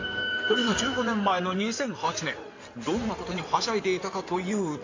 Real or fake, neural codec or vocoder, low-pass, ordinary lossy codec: fake; codec, 16 kHz in and 24 kHz out, 2.2 kbps, FireRedTTS-2 codec; 7.2 kHz; AAC, 48 kbps